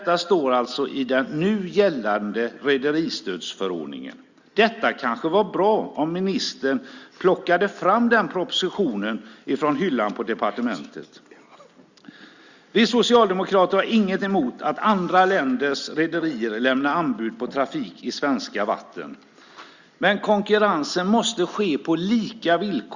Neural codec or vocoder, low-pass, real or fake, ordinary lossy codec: none; 7.2 kHz; real; Opus, 64 kbps